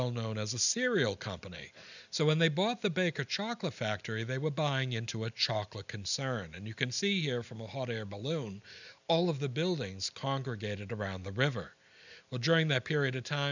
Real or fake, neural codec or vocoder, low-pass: real; none; 7.2 kHz